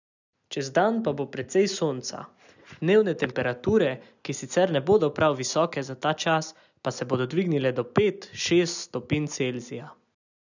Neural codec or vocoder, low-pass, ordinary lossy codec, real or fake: none; 7.2 kHz; none; real